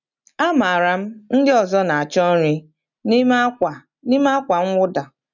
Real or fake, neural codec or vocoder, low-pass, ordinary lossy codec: real; none; 7.2 kHz; none